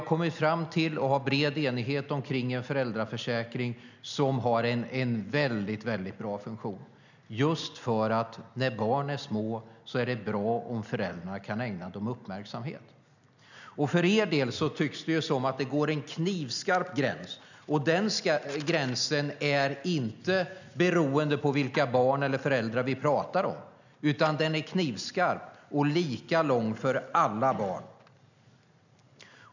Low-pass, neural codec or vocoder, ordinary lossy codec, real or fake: 7.2 kHz; none; none; real